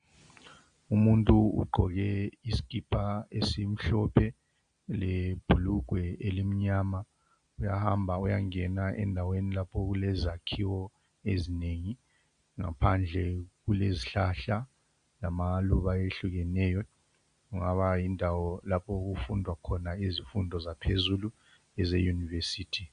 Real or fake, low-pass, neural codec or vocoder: real; 9.9 kHz; none